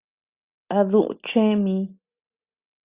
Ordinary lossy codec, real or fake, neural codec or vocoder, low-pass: Opus, 64 kbps; real; none; 3.6 kHz